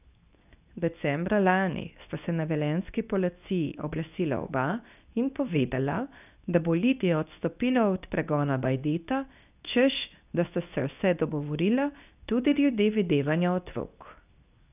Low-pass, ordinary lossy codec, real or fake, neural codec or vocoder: 3.6 kHz; none; fake; codec, 24 kHz, 0.9 kbps, WavTokenizer, medium speech release version 2